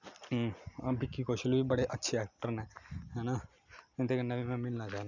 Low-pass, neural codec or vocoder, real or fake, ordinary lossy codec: 7.2 kHz; none; real; none